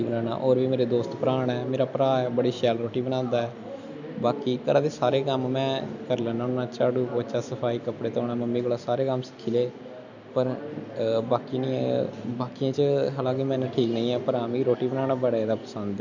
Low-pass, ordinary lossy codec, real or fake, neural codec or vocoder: 7.2 kHz; none; real; none